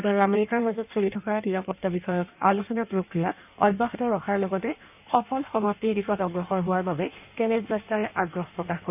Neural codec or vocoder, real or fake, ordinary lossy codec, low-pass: codec, 16 kHz in and 24 kHz out, 1.1 kbps, FireRedTTS-2 codec; fake; none; 3.6 kHz